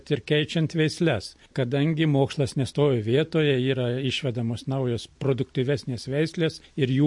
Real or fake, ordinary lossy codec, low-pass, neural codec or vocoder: real; MP3, 48 kbps; 10.8 kHz; none